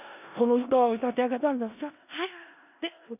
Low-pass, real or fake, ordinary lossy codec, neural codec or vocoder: 3.6 kHz; fake; none; codec, 16 kHz in and 24 kHz out, 0.4 kbps, LongCat-Audio-Codec, four codebook decoder